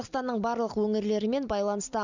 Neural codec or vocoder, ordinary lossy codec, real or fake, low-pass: none; none; real; 7.2 kHz